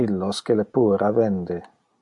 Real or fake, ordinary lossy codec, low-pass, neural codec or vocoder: real; MP3, 64 kbps; 10.8 kHz; none